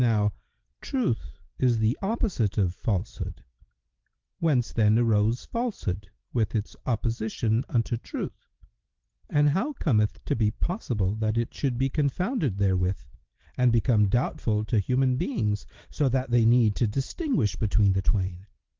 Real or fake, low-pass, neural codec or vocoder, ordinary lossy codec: real; 7.2 kHz; none; Opus, 16 kbps